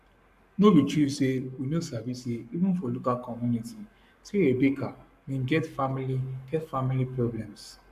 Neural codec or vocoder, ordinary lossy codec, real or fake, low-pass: codec, 44.1 kHz, 7.8 kbps, Pupu-Codec; MP3, 96 kbps; fake; 14.4 kHz